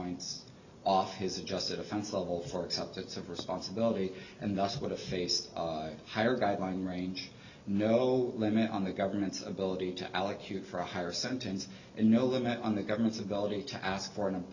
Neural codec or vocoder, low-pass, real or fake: none; 7.2 kHz; real